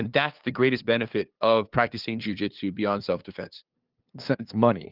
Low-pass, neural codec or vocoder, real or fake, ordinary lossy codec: 5.4 kHz; codec, 16 kHz, 2 kbps, FunCodec, trained on LibriTTS, 25 frames a second; fake; Opus, 24 kbps